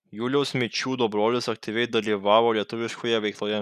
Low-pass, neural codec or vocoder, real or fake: 14.4 kHz; none; real